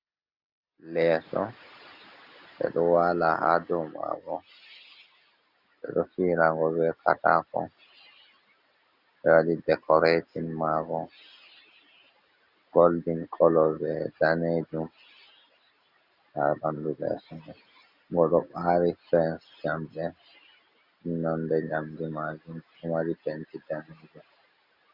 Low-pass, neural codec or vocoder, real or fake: 5.4 kHz; none; real